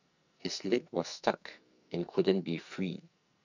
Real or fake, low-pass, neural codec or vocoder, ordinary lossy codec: fake; 7.2 kHz; codec, 44.1 kHz, 2.6 kbps, SNAC; none